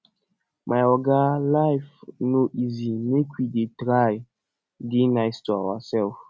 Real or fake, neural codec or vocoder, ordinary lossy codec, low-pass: real; none; none; none